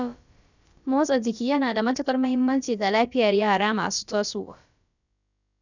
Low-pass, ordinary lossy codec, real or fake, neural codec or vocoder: 7.2 kHz; none; fake; codec, 16 kHz, about 1 kbps, DyCAST, with the encoder's durations